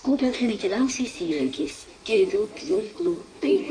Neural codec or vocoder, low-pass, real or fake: codec, 16 kHz in and 24 kHz out, 1.1 kbps, FireRedTTS-2 codec; 9.9 kHz; fake